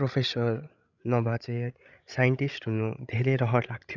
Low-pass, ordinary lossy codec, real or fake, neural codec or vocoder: 7.2 kHz; none; fake; codec, 16 kHz, 16 kbps, FreqCodec, larger model